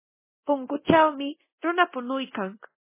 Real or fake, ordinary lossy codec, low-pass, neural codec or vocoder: fake; MP3, 16 kbps; 3.6 kHz; codec, 24 kHz, 0.9 kbps, DualCodec